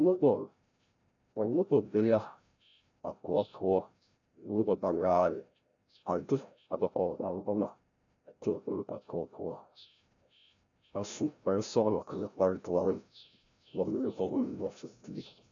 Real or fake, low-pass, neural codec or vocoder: fake; 7.2 kHz; codec, 16 kHz, 0.5 kbps, FreqCodec, larger model